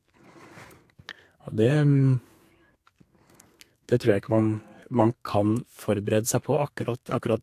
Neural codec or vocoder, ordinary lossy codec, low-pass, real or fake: codec, 32 kHz, 1.9 kbps, SNAC; AAC, 64 kbps; 14.4 kHz; fake